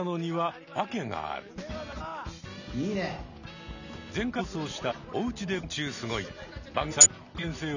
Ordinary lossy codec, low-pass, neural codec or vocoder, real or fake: none; 7.2 kHz; none; real